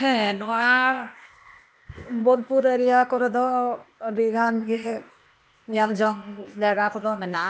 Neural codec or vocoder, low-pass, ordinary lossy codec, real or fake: codec, 16 kHz, 0.8 kbps, ZipCodec; none; none; fake